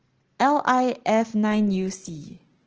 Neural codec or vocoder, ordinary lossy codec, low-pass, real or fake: none; Opus, 16 kbps; 7.2 kHz; real